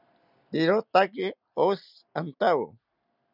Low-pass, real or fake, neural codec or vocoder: 5.4 kHz; real; none